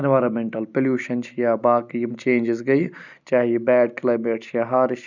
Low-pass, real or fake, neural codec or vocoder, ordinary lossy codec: 7.2 kHz; real; none; none